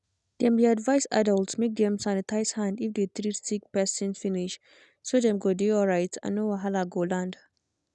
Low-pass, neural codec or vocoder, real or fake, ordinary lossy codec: 10.8 kHz; none; real; none